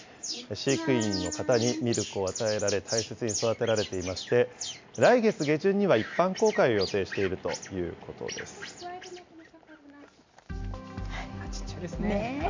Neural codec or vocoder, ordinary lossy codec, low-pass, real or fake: none; MP3, 64 kbps; 7.2 kHz; real